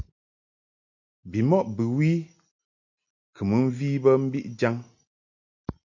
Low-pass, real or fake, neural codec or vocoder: 7.2 kHz; real; none